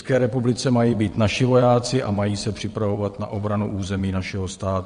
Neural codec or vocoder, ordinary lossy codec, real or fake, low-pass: vocoder, 22.05 kHz, 80 mel bands, WaveNeXt; MP3, 48 kbps; fake; 9.9 kHz